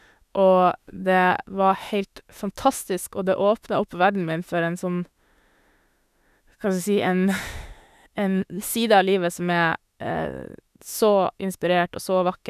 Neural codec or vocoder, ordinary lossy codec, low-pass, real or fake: autoencoder, 48 kHz, 32 numbers a frame, DAC-VAE, trained on Japanese speech; none; 14.4 kHz; fake